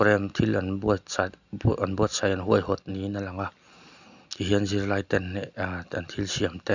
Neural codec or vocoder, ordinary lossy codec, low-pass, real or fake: none; none; 7.2 kHz; real